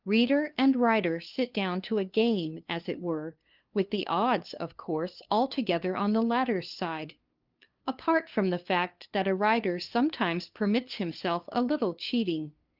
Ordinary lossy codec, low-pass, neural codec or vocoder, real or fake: Opus, 24 kbps; 5.4 kHz; codec, 16 kHz, 2 kbps, FunCodec, trained on LibriTTS, 25 frames a second; fake